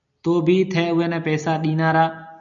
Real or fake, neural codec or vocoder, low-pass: real; none; 7.2 kHz